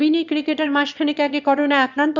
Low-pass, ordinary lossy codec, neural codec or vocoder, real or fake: 7.2 kHz; none; autoencoder, 22.05 kHz, a latent of 192 numbers a frame, VITS, trained on one speaker; fake